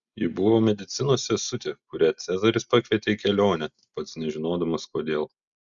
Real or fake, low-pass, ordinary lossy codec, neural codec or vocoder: real; 7.2 kHz; Opus, 64 kbps; none